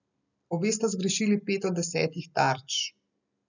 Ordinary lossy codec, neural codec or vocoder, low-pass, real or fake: none; none; 7.2 kHz; real